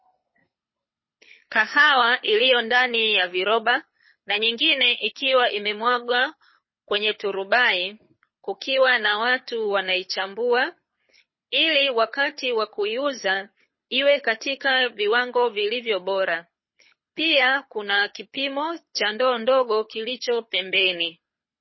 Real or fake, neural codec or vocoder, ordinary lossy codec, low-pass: fake; codec, 24 kHz, 6 kbps, HILCodec; MP3, 24 kbps; 7.2 kHz